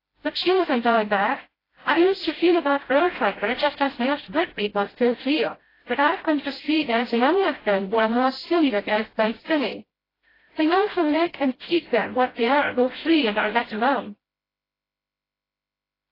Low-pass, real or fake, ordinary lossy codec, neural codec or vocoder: 5.4 kHz; fake; AAC, 24 kbps; codec, 16 kHz, 0.5 kbps, FreqCodec, smaller model